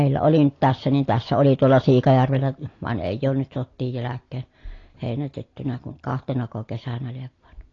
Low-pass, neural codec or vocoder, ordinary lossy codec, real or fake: 7.2 kHz; none; AAC, 32 kbps; real